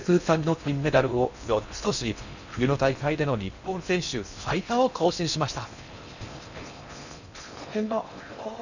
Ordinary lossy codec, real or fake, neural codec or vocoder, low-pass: none; fake; codec, 16 kHz in and 24 kHz out, 0.6 kbps, FocalCodec, streaming, 4096 codes; 7.2 kHz